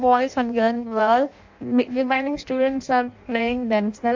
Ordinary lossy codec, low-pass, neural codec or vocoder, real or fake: MP3, 48 kbps; 7.2 kHz; codec, 16 kHz in and 24 kHz out, 0.6 kbps, FireRedTTS-2 codec; fake